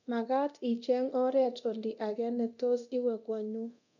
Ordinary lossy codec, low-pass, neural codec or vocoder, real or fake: none; 7.2 kHz; codec, 24 kHz, 0.9 kbps, DualCodec; fake